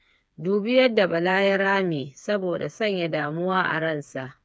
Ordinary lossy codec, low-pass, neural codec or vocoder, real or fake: none; none; codec, 16 kHz, 4 kbps, FreqCodec, smaller model; fake